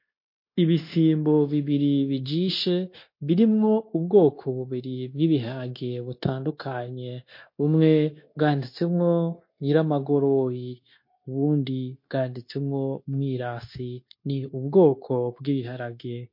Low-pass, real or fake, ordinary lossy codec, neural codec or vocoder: 5.4 kHz; fake; MP3, 32 kbps; codec, 16 kHz, 0.9 kbps, LongCat-Audio-Codec